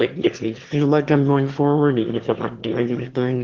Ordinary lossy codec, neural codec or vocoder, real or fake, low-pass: Opus, 16 kbps; autoencoder, 22.05 kHz, a latent of 192 numbers a frame, VITS, trained on one speaker; fake; 7.2 kHz